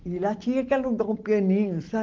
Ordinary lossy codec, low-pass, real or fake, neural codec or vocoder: Opus, 16 kbps; 7.2 kHz; real; none